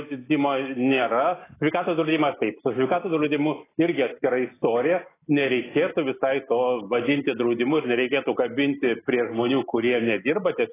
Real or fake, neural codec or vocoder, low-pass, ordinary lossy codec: real; none; 3.6 kHz; AAC, 16 kbps